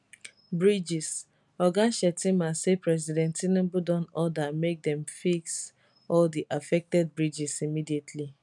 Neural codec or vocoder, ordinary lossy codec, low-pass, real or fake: none; none; 10.8 kHz; real